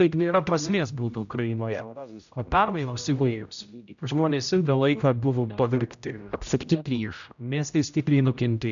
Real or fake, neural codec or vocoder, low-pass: fake; codec, 16 kHz, 0.5 kbps, X-Codec, HuBERT features, trained on general audio; 7.2 kHz